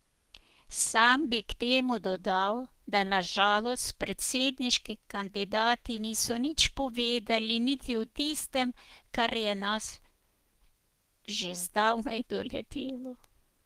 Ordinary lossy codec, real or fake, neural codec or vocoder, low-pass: Opus, 24 kbps; fake; codec, 32 kHz, 1.9 kbps, SNAC; 14.4 kHz